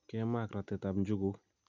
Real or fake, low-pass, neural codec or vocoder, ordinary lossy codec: real; 7.2 kHz; none; none